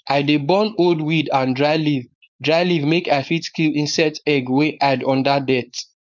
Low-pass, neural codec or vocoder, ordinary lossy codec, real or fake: 7.2 kHz; codec, 16 kHz, 4.8 kbps, FACodec; none; fake